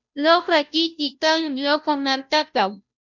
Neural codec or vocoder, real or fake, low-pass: codec, 16 kHz, 0.5 kbps, FunCodec, trained on Chinese and English, 25 frames a second; fake; 7.2 kHz